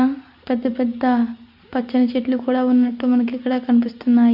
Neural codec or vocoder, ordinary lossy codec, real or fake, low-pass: none; Opus, 64 kbps; real; 5.4 kHz